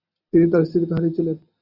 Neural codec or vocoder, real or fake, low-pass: none; real; 5.4 kHz